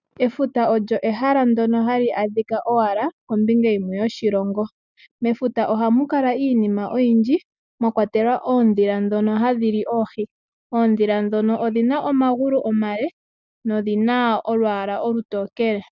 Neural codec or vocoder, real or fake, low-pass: none; real; 7.2 kHz